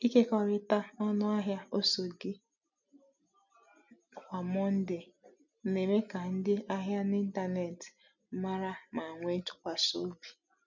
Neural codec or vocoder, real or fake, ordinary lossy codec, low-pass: none; real; none; 7.2 kHz